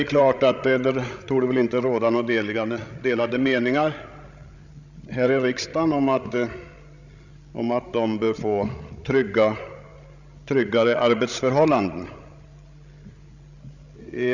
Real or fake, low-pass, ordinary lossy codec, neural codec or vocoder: fake; 7.2 kHz; none; codec, 16 kHz, 16 kbps, FreqCodec, larger model